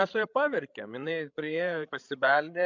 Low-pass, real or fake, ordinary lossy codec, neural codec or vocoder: 7.2 kHz; fake; MP3, 64 kbps; codec, 16 kHz, 16 kbps, FreqCodec, larger model